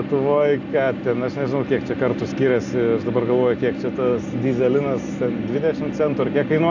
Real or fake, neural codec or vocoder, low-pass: real; none; 7.2 kHz